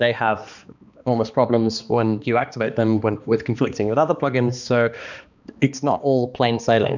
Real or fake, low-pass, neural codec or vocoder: fake; 7.2 kHz; codec, 16 kHz, 2 kbps, X-Codec, HuBERT features, trained on balanced general audio